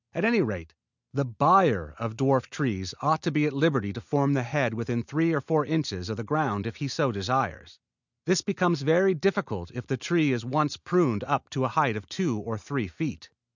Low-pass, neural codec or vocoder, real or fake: 7.2 kHz; none; real